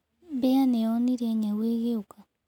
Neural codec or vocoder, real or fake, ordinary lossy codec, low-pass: none; real; none; 19.8 kHz